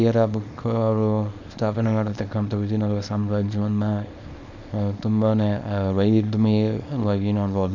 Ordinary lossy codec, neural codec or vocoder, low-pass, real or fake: none; codec, 24 kHz, 0.9 kbps, WavTokenizer, small release; 7.2 kHz; fake